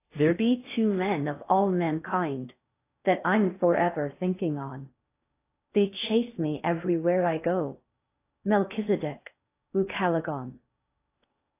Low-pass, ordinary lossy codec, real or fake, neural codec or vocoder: 3.6 kHz; AAC, 24 kbps; fake; codec, 16 kHz in and 24 kHz out, 0.6 kbps, FocalCodec, streaming, 4096 codes